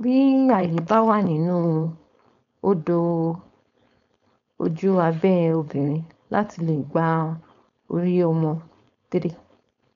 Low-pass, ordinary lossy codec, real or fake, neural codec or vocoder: 7.2 kHz; none; fake; codec, 16 kHz, 4.8 kbps, FACodec